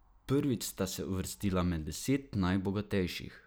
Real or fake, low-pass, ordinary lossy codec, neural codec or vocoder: real; none; none; none